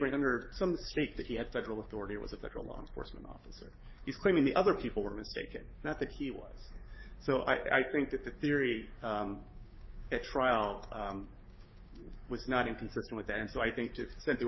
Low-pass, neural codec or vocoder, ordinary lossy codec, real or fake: 7.2 kHz; codec, 16 kHz, 6 kbps, DAC; MP3, 24 kbps; fake